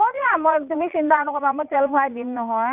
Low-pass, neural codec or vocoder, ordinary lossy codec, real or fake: 3.6 kHz; vocoder, 22.05 kHz, 80 mel bands, Vocos; none; fake